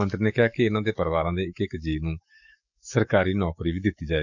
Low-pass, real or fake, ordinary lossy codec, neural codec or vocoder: 7.2 kHz; fake; none; codec, 44.1 kHz, 7.8 kbps, DAC